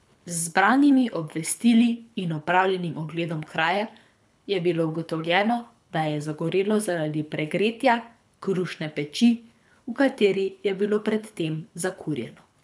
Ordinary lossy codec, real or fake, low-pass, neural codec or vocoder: none; fake; none; codec, 24 kHz, 6 kbps, HILCodec